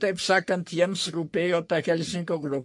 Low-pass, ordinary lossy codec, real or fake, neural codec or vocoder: 10.8 kHz; MP3, 48 kbps; fake; codec, 44.1 kHz, 3.4 kbps, Pupu-Codec